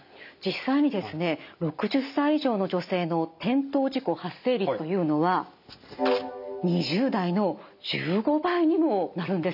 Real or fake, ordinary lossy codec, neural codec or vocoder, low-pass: real; none; none; 5.4 kHz